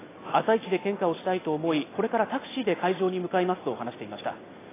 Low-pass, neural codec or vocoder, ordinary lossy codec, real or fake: 3.6 kHz; none; AAC, 16 kbps; real